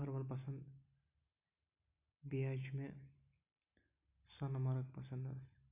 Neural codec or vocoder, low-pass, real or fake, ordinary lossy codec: none; 3.6 kHz; real; none